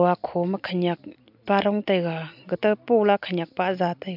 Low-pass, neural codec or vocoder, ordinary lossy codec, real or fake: 5.4 kHz; none; MP3, 48 kbps; real